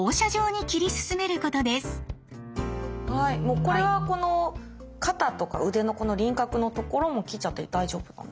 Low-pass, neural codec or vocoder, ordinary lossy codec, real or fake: none; none; none; real